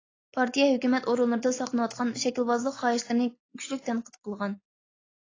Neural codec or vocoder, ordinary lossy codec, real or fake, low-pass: none; AAC, 32 kbps; real; 7.2 kHz